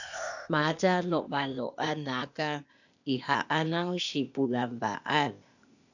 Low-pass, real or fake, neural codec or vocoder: 7.2 kHz; fake; codec, 16 kHz, 0.8 kbps, ZipCodec